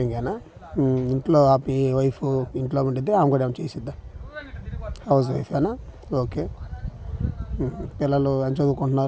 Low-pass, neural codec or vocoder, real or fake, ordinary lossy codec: none; none; real; none